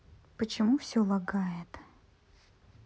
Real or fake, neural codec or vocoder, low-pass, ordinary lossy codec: real; none; none; none